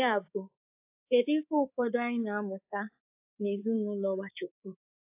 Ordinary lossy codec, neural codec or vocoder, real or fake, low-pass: AAC, 32 kbps; codec, 24 kHz, 3.1 kbps, DualCodec; fake; 3.6 kHz